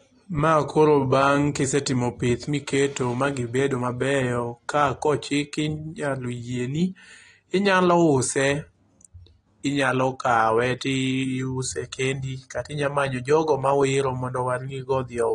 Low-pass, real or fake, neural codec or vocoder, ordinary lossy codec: 10.8 kHz; real; none; AAC, 32 kbps